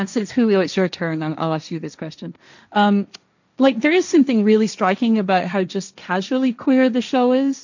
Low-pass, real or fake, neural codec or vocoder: 7.2 kHz; fake; codec, 16 kHz, 1.1 kbps, Voila-Tokenizer